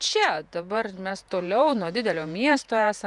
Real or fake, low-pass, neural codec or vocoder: fake; 10.8 kHz; vocoder, 48 kHz, 128 mel bands, Vocos